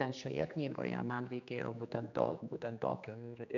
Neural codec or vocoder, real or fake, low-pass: codec, 16 kHz, 2 kbps, X-Codec, HuBERT features, trained on general audio; fake; 7.2 kHz